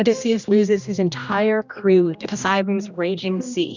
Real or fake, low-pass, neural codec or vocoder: fake; 7.2 kHz; codec, 16 kHz, 1 kbps, X-Codec, HuBERT features, trained on general audio